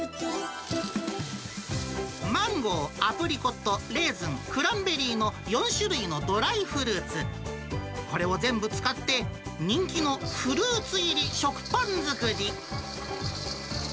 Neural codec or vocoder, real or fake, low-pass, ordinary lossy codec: none; real; none; none